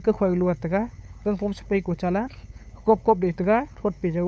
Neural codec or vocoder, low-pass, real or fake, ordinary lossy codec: codec, 16 kHz, 8 kbps, FunCodec, trained on LibriTTS, 25 frames a second; none; fake; none